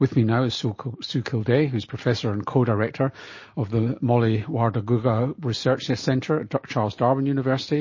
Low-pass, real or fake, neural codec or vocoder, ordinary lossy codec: 7.2 kHz; real; none; MP3, 32 kbps